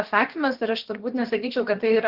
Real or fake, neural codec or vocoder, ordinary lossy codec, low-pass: fake; codec, 16 kHz, about 1 kbps, DyCAST, with the encoder's durations; Opus, 16 kbps; 5.4 kHz